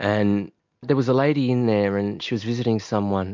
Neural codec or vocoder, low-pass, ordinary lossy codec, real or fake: none; 7.2 kHz; MP3, 48 kbps; real